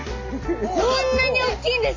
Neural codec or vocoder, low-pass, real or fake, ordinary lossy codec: none; 7.2 kHz; real; AAC, 48 kbps